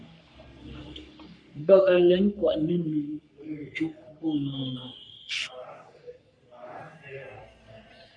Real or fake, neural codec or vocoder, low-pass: fake; codec, 44.1 kHz, 3.4 kbps, Pupu-Codec; 9.9 kHz